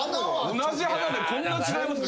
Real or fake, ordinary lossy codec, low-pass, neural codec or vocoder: real; none; none; none